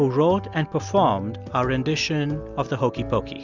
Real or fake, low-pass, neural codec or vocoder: real; 7.2 kHz; none